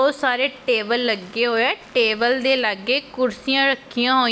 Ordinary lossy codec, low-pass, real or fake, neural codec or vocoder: none; none; real; none